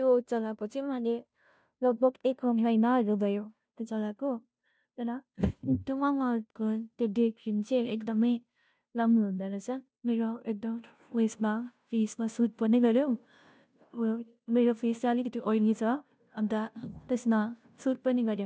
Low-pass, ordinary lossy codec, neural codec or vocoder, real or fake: none; none; codec, 16 kHz, 0.5 kbps, FunCodec, trained on Chinese and English, 25 frames a second; fake